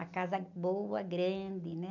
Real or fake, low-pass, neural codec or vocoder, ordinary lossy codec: real; 7.2 kHz; none; none